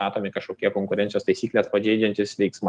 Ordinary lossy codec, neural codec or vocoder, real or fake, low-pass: Opus, 32 kbps; none; real; 9.9 kHz